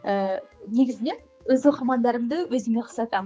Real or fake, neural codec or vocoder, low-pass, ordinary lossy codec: fake; codec, 16 kHz, 4 kbps, X-Codec, HuBERT features, trained on general audio; none; none